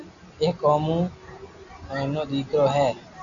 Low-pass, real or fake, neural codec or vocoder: 7.2 kHz; real; none